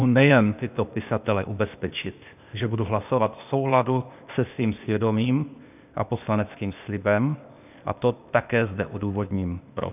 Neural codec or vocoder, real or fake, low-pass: codec, 16 kHz, 0.8 kbps, ZipCodec; fake; 3.6 kHz